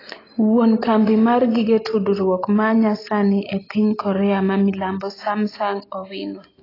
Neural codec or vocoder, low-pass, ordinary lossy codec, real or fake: none; 5.4 kHz; AAC, 24 kbps; real